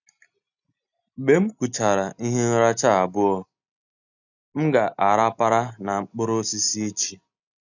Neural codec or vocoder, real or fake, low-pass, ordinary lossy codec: none; real; 7.2 kHz; none